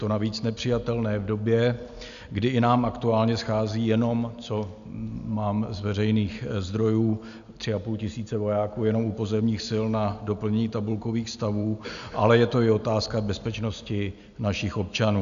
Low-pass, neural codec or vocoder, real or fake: 7.2 kHz; none; real